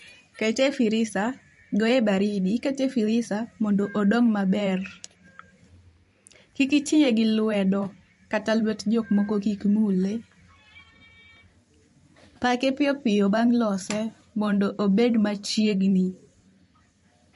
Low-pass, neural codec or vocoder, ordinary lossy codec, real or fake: 14.4 kHz; vocoder, 44.1 kHz, 128 mel bands every 512 samples, BigVGAN v2; MP3, 48 kbps; fake